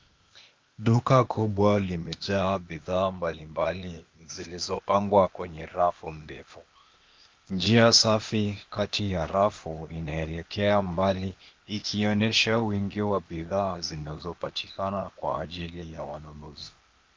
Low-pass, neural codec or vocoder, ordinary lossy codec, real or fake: 7.2 kHz; codec, 16 kHz, 0.8 kbps, ZipCodec; Opus, 24 kbps; fake